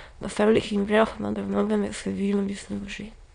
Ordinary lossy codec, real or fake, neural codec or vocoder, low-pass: none; fake; autoencoder, 22.05 kHz, a latent of 192 numbers a frame, VITS, trained on many speakers; 9.9 kHz